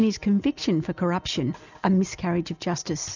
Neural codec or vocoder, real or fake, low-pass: none; real; 7.2 kHz